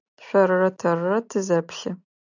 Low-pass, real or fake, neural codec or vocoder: 7.2 kHz; real; none